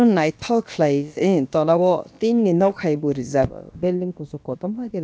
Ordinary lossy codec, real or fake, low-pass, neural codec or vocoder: none; fake; none; codec, 16 kHz, about 1 kbps, DyCAST, with the encoder's durations